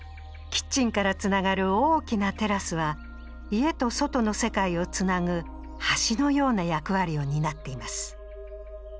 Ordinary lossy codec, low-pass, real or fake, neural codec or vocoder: none; none; real; none